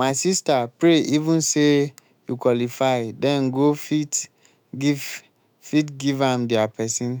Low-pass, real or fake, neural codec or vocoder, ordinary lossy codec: none; fake; autoencoder, 48 kHz, 128 numbers a frame, DAC-VAE, trained on Japanese speech; none